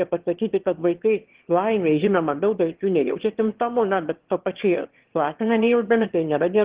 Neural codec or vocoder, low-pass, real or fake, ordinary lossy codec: autoencoder, 22.05 kHz, a latent of 192 numbers a frame, VITS, trained on one speaker; 3.6 kHz; fake; Opus, 16 kbps